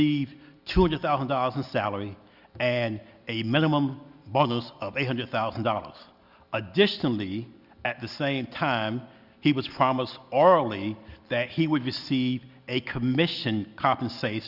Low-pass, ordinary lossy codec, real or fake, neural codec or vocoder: 5.4 kHz; Opus, 64 kbps; real; none